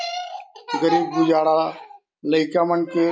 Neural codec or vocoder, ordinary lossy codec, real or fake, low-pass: none; none; real; none